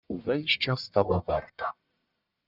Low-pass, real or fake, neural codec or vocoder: 5.4 kHz; fake; codec, 44.1 kHz, 1.7 kbps, Pupu-Codec